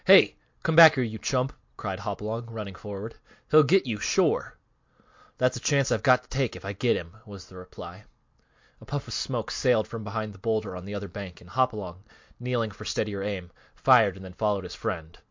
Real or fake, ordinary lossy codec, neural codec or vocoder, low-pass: real; MP3, 48 kbps; none; 7.2 kHz